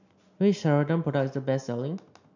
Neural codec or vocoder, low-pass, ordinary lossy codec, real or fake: none; 7.2 kHz; none; real